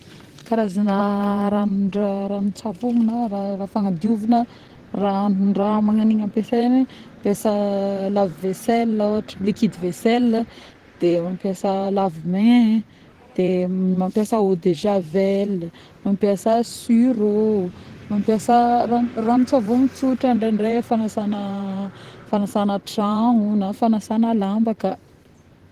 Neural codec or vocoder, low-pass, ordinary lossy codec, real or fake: vocoder, 44.1 kHz, 128 mel bands, Pupu-Vocoder; 14.4 kHz; Opus, 16 kbps; fake